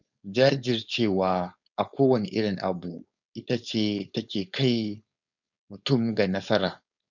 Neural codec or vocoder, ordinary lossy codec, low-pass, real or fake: codec, 16 kHz, 4.8 kbps, FACodec; none; 7.2 kHz; fake